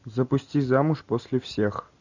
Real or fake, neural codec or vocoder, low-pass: real; none; 7.2 kHz